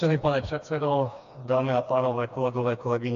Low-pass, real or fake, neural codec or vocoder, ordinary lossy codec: 7.2 kHz; fake; codec, 16 kHz, 2 kbps, FreqCodec, smaller model; AAC, 64 kbps